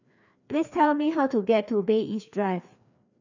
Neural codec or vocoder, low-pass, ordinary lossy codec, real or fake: codec, 16 kHz, 2 kbps, FreqCodec, larger model; 7.2 kHz; none; fake